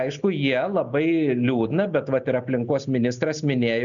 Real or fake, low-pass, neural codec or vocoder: real; 7.2 kHz; none